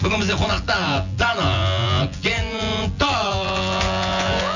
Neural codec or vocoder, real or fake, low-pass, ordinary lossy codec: vocoder, 24 kHz, 100 mel bands, Vocos; fake; 7.2 kHz; none